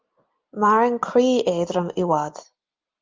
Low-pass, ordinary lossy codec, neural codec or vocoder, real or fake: 7.2 kHz; Opus, 24 kbps; none; real